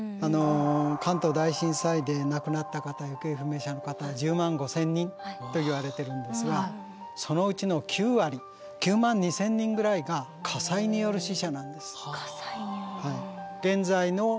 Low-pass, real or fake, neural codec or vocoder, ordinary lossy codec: none; real; none; none